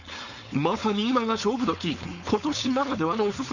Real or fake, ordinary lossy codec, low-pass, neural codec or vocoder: fake; none; 7.2 kHz; codec, 16 kHz, 4.8 kbps, FACodec